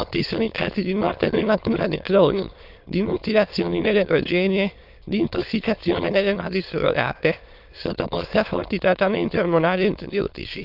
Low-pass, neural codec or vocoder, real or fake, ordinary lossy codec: 5.4 kHz; autoencoder, 22.05 kHz, a latent of 192 numbers a frame, VITS, trained on many speakers; fake; Opus, 32 kbps